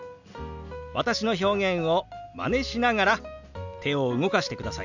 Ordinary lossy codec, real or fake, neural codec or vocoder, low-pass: none; real; none; 7.2 kHz